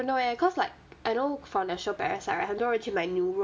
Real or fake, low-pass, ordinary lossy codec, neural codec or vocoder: real; none; none; none